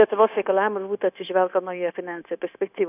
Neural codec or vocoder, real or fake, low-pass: codec, 16 kHz, 0.9 kbps, LongCat-Audio-Codec; fake; 3.6 kHz